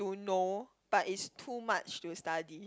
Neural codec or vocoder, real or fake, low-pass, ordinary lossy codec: none; real; none; none